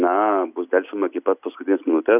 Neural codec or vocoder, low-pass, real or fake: none; 3.6 kHz; real